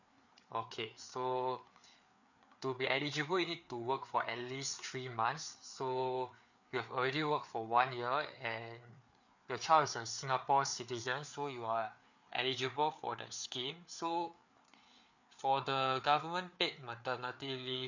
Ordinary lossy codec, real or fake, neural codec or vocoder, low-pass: none; fake; codec, 16 kHz, 4 kbps, FreqCodec, larger model; 7.2 kHz